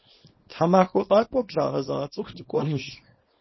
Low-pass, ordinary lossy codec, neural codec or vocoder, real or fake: 7.2 kHz; MP3, 24 kbps; codec, 24 kHz, 0.9 kbps, WavTokenizer, small release; fake